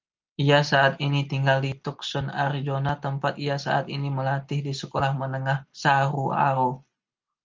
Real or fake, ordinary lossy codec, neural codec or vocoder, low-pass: real; Opus, 24 kbps; none; 7.2 kHz